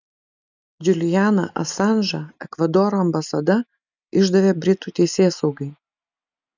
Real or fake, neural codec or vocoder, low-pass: real; none; 7.2 kHz